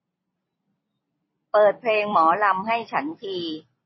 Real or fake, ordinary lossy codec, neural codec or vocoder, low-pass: fake; MP3, 24 kbps; vocoder, 44.1 kHz, 128 mel bands every 256 samples, BigVGAN v2; 7.2 kHz